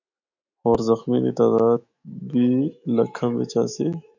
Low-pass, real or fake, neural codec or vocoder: 7.2 kHz; fake; autoencoder, 48 kHz, 128 numbers a frame, DAC-VAE, trained on Japanese speech